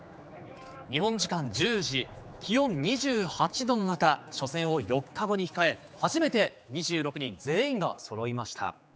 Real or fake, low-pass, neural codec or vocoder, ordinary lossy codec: fake; none; codec, 16 kHz, 4 kbps, X-Codec, HuBERT features, trained on general audio; none